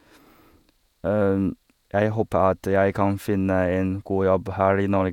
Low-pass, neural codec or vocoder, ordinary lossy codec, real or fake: 19.8 kHz; vocoder, 48 kHz, 128 mel bands, Vocos; none; fake